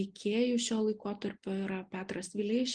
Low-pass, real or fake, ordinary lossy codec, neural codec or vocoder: 9.9 kHz; real; Opus, 24 kbps; none